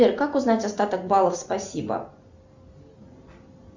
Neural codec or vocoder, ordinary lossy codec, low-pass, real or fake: none; Opus, 64 kbps; 7.2 kHz; real